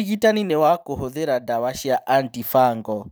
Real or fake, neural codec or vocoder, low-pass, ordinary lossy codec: fake; vocoder, 44.1 kHz, 128 mel bands every 512 samples, BigVGAN v2; none; none